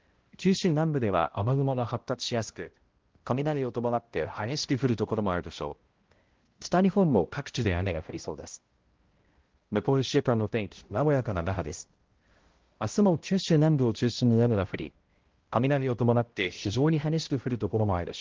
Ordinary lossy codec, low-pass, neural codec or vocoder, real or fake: Opus, 16 kbps; 7.2 kHz; codec, 16 kHz, 0.5 kbps, X-Codec, HuBERT features, trained on balanced general audio; fake